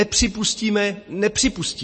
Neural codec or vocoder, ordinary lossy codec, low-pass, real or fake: none; MP3, 32 kbps; 10.8 kHz; real